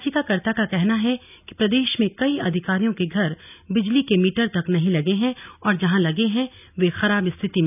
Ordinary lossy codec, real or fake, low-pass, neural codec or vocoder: none; real; 3.6 kHz; none